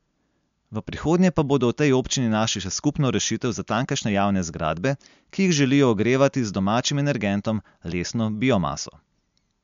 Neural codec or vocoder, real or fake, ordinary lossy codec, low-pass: none; real; MP3, 64 kbps; 7.2 kHz